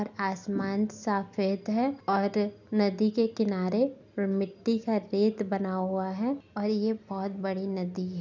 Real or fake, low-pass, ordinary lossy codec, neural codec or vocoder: real; 7.2 kHz; none; none